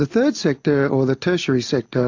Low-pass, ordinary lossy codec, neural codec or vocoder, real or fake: 7.2 kHz; AAC, 48 kbps; none; real